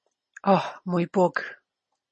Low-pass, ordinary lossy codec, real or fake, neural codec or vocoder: 10.8 kHz; MP3, 32 kbps; real; none